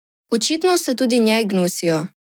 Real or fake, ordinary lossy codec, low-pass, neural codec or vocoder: fake; none; none; codec, 44.1 kHz, 7.8 kbps, DAC